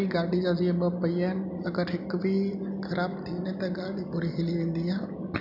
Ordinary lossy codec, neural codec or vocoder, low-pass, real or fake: none; none; 5.4 kHz; real